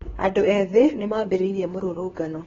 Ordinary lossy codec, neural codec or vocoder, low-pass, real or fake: AAC, 24 kbps; codec, 16 kHz, 4 kbps, X-Codec, HuBERT features, trained on LibriSpeech; 7.2 kHz; fake